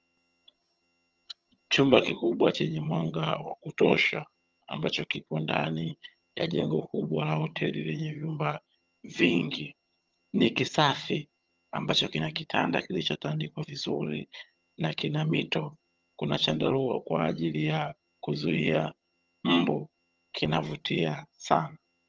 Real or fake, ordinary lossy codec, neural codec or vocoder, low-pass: fake; Opus, 24 kbps; vocoder, 22.05 kHz, 80 mel bands, HiFi-GAN; 7.2 kHz